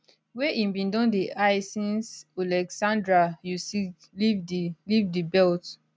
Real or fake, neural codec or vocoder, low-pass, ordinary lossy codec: real; none; none; none